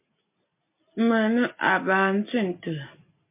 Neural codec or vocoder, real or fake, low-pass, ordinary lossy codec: none; real; 3.6 kHz; MP3, 24 kbps